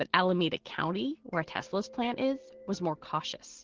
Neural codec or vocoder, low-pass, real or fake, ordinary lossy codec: none; 7.2 kHz; real; Opus, 16 kbps